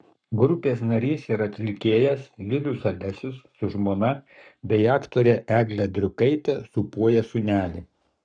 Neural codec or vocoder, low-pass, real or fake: codec, 44.1 kHz, 7.8 kbps, Pupu-Codec; 9.9 kHz; fake